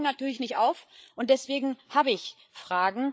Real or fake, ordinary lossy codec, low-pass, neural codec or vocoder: fake; none; none; codec, 16 kHz, 8 kbps, FreqCodec, larger model